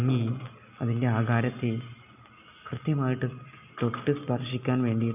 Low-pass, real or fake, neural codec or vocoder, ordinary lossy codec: 3.6 kHz; real; none; none